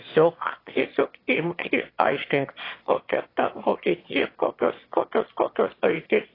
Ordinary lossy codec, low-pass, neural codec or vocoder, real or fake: AAC, 24 kbps; 5.4 kHz; autoencoder, 22.05 kHz, a latent of 192 numbers a frame, VITS, trained on one speaker; fake